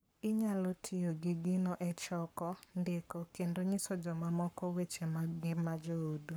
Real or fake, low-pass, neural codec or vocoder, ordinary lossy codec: fake; none; codec, 44.1 kHz, 7.8 kbps, Pupu-Codec; none